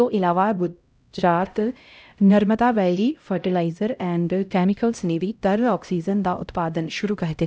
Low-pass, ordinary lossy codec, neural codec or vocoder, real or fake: none; none; codec, 16 kHz, 0.5 kbps, X-Codec, HuBERT features, trained on LibriSpeech; fake